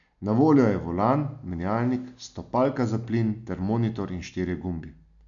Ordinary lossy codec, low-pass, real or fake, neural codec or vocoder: none; 7.2 kHz; real; none